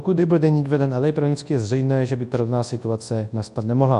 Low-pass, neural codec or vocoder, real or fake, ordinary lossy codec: 10.8 kHz; codec, 24 kHz, 0.9 kbps, WavTokenizer, large speech release; fake; AAC, 64 kbps